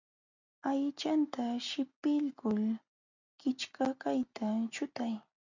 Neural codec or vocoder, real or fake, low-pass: none; real; 7.2 kHz